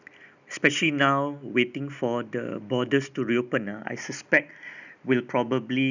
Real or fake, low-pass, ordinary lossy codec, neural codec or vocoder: real; 7.2 kHz; none; none